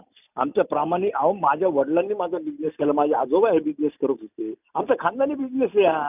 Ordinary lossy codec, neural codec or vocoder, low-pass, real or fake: none; none; 3.6 kHz; real